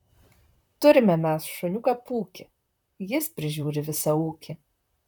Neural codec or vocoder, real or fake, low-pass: vocoder, 44.1 kHz, 128 mel bands, Pupu-Vocoder; fake; 19.8 kHz